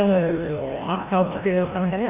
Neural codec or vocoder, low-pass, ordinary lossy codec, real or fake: codec, 16 kHz, 1 kbps, FreqCodec, larger model; 3.6 kHz; MP3, 32 kbps; fake